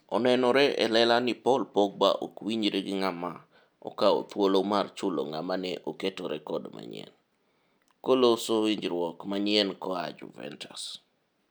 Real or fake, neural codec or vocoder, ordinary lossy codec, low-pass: real; none; none; none